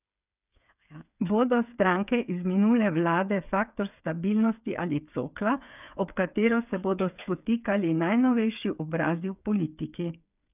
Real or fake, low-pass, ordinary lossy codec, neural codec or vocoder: fake; 3.6 kHz; none; codec, 16 kHz, 8 kbps, FreqCodec, smaller model